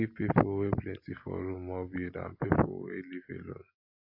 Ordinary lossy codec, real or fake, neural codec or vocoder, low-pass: AAC, 32 kbps; real; none; 5.4 kHz